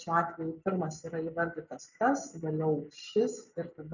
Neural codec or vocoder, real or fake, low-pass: none; real; 7.2 kHz